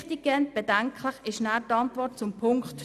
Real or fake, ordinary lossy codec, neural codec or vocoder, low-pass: real; none; none; 14.4 kHz